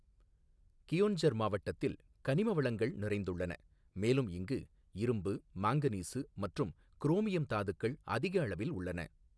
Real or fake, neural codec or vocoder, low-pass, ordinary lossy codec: real; none; none; none